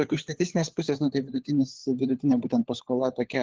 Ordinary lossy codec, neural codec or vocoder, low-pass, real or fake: Opus, 16 kbps; codec, 16 kHz, 4 kbps, FunCodec, trained on LibriTTS, 50 frames a second; 7.2 kHz; fake